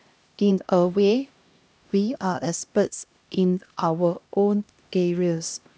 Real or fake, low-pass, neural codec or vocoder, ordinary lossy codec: fake; none; codec, 16 kHz, 1 kbps, X-Codec, HuBERT features, trained on LibriSpeech; none